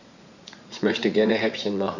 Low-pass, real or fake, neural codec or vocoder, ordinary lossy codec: 7.2 kHz; fake; vocoder, 44.1 kHz, 80 mel bands, Vocos; none